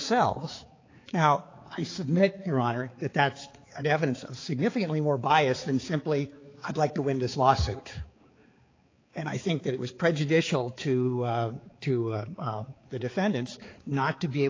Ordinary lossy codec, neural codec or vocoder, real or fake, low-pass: AAC, 32 kbps; codec, 16 kHz, 4 kbps, X-Codec, HuBERT features, trained on balanced general audio; fake; 7.2 kHz